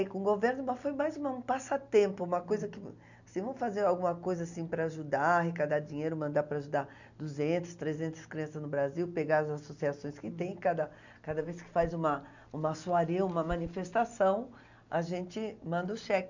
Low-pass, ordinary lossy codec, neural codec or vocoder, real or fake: 7.2 kHz; none; none; real